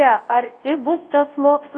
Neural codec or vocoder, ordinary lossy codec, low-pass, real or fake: codec, 24 kHz, 0.9 kbps, WavTokenizer, large speech release; AAC, 32 kbps; 9.9 kHz; fake